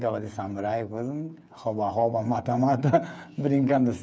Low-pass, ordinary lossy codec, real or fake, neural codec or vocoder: none; none; fake; codec, 16 kHz, 8 kbps, FreqCodec, smaller model